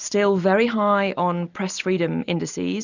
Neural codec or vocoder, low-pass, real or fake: none; 7.2 kHz; real